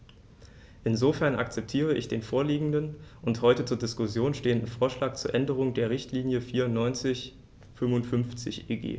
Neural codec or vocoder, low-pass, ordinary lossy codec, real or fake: none; none; none; real